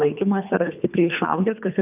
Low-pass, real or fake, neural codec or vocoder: 3.6 kHz; fake; codec, 24 kHz, 3 kbps, HILCodec